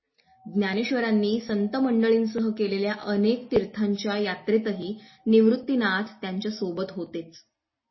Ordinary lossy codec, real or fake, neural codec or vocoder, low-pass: MP3, 24 kbps; real; none; 7.2 kHz